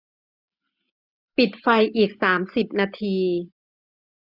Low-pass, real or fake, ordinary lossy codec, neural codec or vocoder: 5.4 kHz; real; none; none